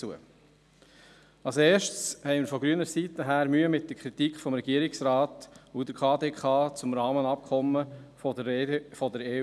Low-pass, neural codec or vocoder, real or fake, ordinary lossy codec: none; none; real; none